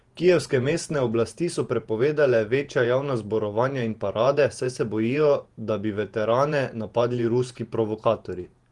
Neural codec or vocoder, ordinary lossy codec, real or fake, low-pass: vocoder, 48 kHz, 128 mel bands, Vocos; Opus, 24 kbps; fake; 10.8 kHz